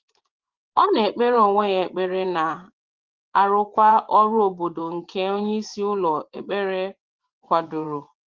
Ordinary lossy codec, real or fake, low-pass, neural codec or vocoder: Opus, 16 kbps; fake; 7.2 kHz; vocoder, 24 kHz, 100 mel bands, Vocos